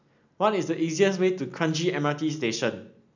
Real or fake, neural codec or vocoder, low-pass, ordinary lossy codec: real; none; 7.2 kHz; none